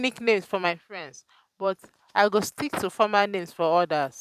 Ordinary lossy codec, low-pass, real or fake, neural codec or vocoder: none; 14.4 kHz; fake; vocoder, 44.1 kHz, 128 mel bands every 512 samples, BigVGAN v2